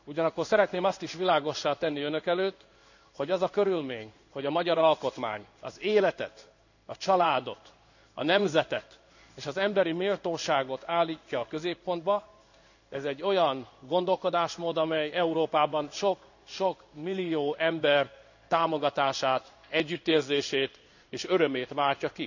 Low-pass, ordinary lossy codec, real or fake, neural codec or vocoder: 7.2 kHz; none; fake; codec, 16 kHz in and 24 kHz out, 1 kbps, XY-Tokenizer